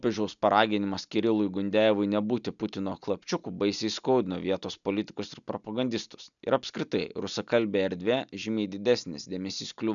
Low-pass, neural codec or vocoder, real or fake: 7.2 kHz; none; real